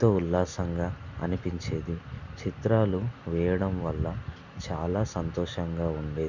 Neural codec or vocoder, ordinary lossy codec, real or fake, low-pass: none; none; real; 7.2 kHz